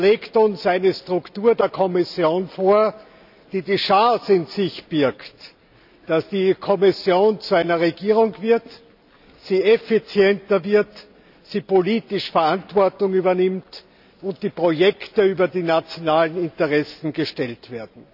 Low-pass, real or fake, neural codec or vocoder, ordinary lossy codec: 5.4 kHz; real; none; none